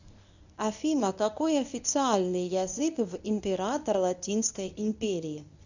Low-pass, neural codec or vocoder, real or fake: 7.2 kHz; codec, 24 kHz, 0.9 kbps, WavTokenizer, medium speech release version 1; fake